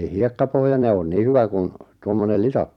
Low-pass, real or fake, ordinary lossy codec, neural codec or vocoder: 19.8 kHz; fake; none; vocoder, 44.1 kHz, 128 mel bands every 256 samples, BigVGAN v2